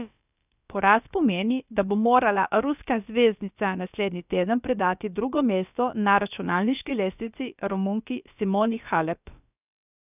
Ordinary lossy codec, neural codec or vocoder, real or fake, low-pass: none; codec, 16 kHz, about 1 kbps, DyCAST, with the encoder's durations; fake; 3.6 kHz